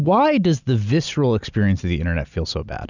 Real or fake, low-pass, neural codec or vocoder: real; 7.2 kHz; none